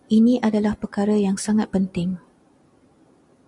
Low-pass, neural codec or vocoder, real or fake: 10.8 kHz; none; real